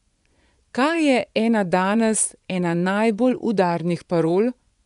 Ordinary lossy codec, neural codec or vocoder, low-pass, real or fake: none; vocoder, 24 kHz, 100 mel bands, Vocos; 10.8 kHz; fake